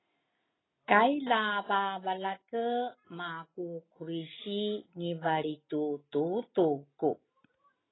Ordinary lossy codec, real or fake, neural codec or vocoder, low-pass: AAC, 16 kbps; real; none; 7.2 kHz